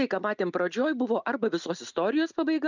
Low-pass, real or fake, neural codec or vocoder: 7.2 kHz; real; none